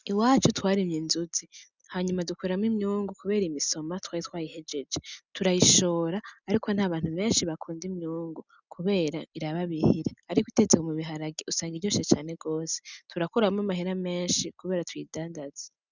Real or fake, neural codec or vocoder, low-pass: real; none; 7.2 kHz